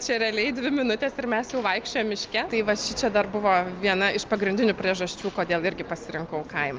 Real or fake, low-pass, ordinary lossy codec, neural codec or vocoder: real; 7.2 kHz; Opus, 32 kbps; none